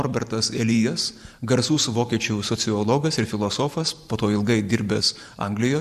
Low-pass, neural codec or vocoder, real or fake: 14.4 kHz; vocoder, 44.1 kHz, 128 mel bands every 512 samples, BigVGAN v2; fake